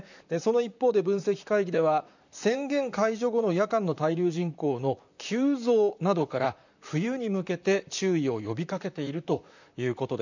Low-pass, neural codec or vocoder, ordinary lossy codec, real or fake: 7.2 kHz; vocoder, 44.1 kHz, 128 mel bands, Pupu-Vocoder; none; fake